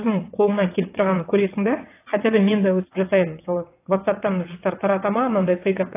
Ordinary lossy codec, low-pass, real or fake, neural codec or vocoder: AAC, 24 kbps; 3.6 kHz; fake; vocoder, 22.05 kHz, 80 mel bands, WaveNeXt